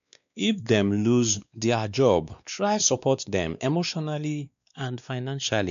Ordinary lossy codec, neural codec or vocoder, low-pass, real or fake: none; codec, 16 kHz, 2 kbps, X-Codec, WavLM features, trained on Multilingual LibriSpeech; 7.2 kHz; fake